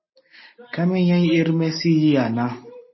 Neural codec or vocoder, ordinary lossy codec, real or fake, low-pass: none; MP3, 24 kbps; real; 7.2 kHz